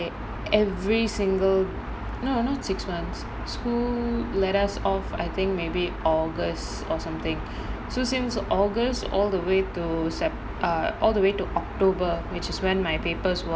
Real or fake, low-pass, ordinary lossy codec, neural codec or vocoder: real; none; none; none